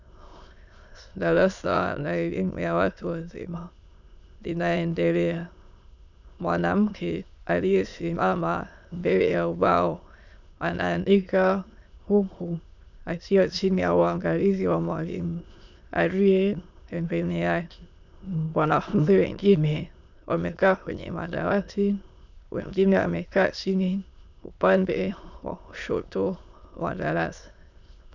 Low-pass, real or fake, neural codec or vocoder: 7.2 kHz; fake; autoencoder, 22.05 kHz, a latent of 192 numbers a frame, VITS, trained on many speakers